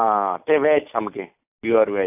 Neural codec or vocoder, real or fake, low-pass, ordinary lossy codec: none; real; 3.6 kHz; AAC, 32 kbps